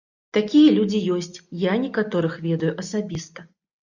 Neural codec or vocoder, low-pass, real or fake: none; 7.2 kHz; real